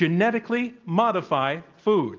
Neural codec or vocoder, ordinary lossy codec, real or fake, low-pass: none; Opus, 24 kbps; real; 7.2 kHz